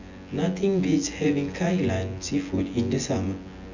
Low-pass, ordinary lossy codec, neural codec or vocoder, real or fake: 7.2 kHz; none; vocoder, 24 kHz, 100 mel bands, Vocos; fake